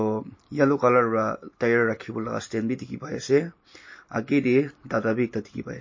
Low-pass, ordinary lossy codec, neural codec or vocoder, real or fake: 7.2 kHz; MP3, 32 kbps; none; real